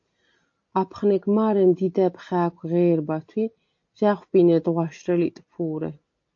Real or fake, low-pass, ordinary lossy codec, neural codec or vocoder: real; 7.2 kHz; AAC, 48 kbps; none